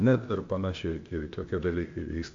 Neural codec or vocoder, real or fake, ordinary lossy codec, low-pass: codec, 16 kHz, 0.8 kbps, ZipCodec; fake; AAC, 48 kbps; 7.2 kHz